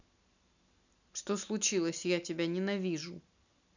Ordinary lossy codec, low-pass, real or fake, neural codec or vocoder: none; 7.2 kHz; real; none